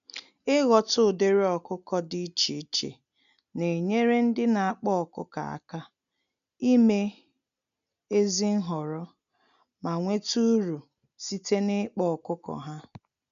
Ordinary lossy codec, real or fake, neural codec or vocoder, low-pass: none; real; none; 7.2 kHz